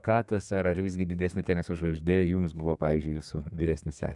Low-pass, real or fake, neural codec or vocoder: 10.8 kHz; fake; codec, 32 kHz, 1.9 kbps, SNAC